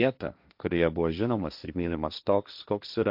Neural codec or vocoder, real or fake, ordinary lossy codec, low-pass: codec, 16 kHz, 1.1 kbps, Voila-Tokenizer; fake; AAC, 48 kbps; 5.4 kHz